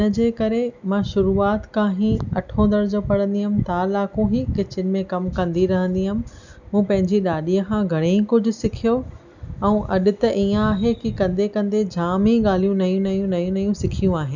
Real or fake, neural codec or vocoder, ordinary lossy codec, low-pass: real; none; none; 7.2 kHz